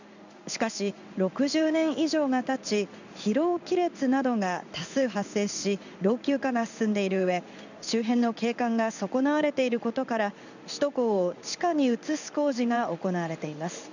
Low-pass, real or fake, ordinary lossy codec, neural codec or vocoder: 7.2 kHz; fake; none; codec, 16 kHz in and 24 kHz out, 1 kbps, XY-Tokenizer